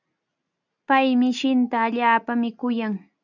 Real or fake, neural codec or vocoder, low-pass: real; none; 7.2 kHz